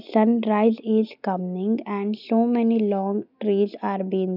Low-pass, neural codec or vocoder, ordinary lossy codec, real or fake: 5.4 kHz; none; none; real